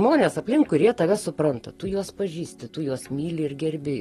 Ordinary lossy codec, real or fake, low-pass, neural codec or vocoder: AAC, 32 kbps; real; 14.4 kHz; none